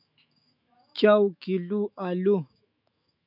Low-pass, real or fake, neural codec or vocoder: 5.4 kHz; fake; autoencoder, 48 kHz, 128 numbers a frame, DAC-VAE, trained on Japanese speech